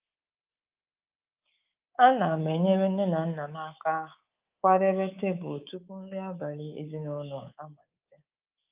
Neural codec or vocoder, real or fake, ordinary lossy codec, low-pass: codec, 24 kHz, 3.1 kbps, DualCodec; fake; Opus, 32 kbps; 3.6 kHz